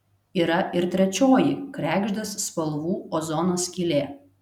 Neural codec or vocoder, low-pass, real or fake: none; 19.8 kHz; real